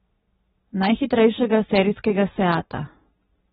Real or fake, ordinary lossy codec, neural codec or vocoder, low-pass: real; AAC, 16 kbps; none; 19.8 kHz